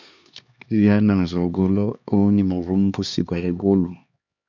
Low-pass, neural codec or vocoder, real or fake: 7.2 kHz; codec, 16 kHz, 2 kbps, X-Codec, HuBERT features, trained on LibriSpeech; fake